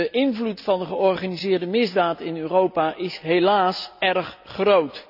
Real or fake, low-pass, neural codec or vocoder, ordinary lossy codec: real; 5.4 kHz; none; none